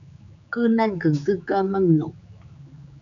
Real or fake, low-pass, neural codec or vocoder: fake; 7.2 kHz; codec, 16 kHz, 4 kbps, X-Codec, HuBERT features, trained on general audio